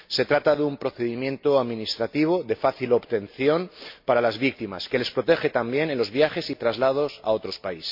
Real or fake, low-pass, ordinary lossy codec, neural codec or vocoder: real; 5.4 kHz; MP3, 32 kbps; none